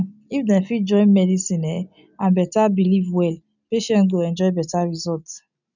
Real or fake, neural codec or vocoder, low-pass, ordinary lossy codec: real; none; 7.2 kHz; none